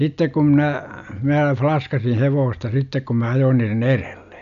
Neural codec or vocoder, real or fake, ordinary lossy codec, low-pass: none; real; none; 7.2 kHz